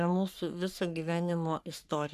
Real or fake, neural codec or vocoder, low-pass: fake; codec, 44.1 kHz, 3.4 kbps, Pupu-Codec; 14.4 kHz